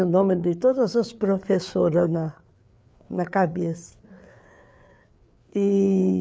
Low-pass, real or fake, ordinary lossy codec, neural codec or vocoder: none; fake; none; codec, 16 kHz, 4 kbps, FreqCodec, larger model